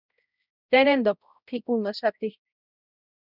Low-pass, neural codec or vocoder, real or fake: 5.4 kHz; codec, 16 kHz, 0.5 kbps, X-Codec, HuBERT features, trained on balanced general audio; fake